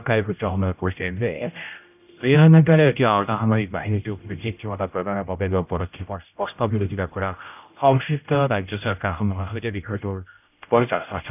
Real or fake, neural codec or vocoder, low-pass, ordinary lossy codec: fake; codec, 16 kHz, 0.5 kbps, X-Codec, HuBERT features, trained on general audio; 3.6 kHz; none